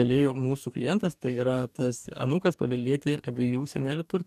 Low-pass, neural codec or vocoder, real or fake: 14.4 kHz; codec, 44.1 kHz, 2.6 kbps, DAC; fake